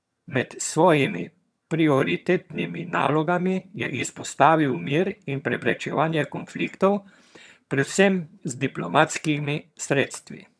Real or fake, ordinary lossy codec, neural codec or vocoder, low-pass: fake; none; vocoder, 22.05 kHz, 80 mel bands, HiFi-GAN; none